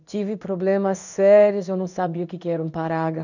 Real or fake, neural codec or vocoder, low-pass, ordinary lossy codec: fake; codec, 16 kHz in and 24 kHz out, 1 kbps, XY-Tokenizer; 7.2 kHz; none